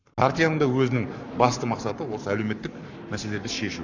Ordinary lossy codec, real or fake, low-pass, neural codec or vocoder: none; fake; 7.2 kHz; codec, 44.1 kHz, 7.8 kbps, Pupu-Codec